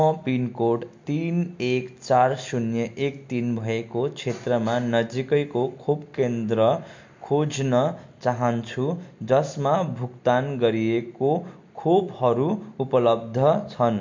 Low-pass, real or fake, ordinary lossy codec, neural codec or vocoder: 7.2 kHz; real; MP3, 48 kbps; none